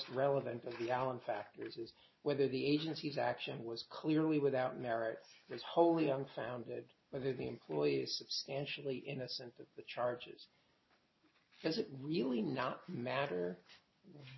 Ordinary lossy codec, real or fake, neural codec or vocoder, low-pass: MP3, 24 kbps; real; none; 7.2 kHz